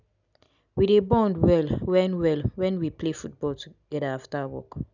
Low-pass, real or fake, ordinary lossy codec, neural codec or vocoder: 7.2 kHz; real; none; none